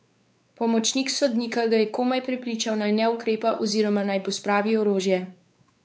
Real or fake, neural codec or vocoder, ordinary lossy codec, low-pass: fake; codec, 16 kHz, 4 kbps, X-Codec, WavLM features, trained on Multilingual LibriSpeech; none; none